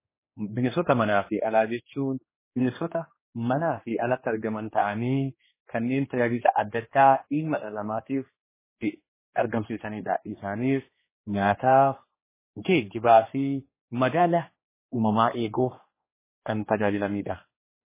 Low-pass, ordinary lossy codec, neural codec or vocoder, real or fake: 3.6 kHz; MP3, 16 kbps; codec, 16 kHz, 2 kbps, X-Codec, HuBERT features, trained on general audio; fake